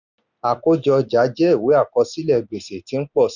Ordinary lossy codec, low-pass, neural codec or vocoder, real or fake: none; 7.2 kHz; none; real